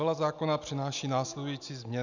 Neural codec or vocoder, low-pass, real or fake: none; 7.2 kHz; real